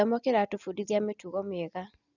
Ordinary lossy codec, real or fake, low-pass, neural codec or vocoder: none; real; 7.2 kHz; none